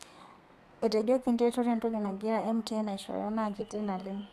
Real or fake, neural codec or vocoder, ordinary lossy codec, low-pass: fake; codec, 32 kHz, 1.9 kbps, SNAC; none; 14.4 kHz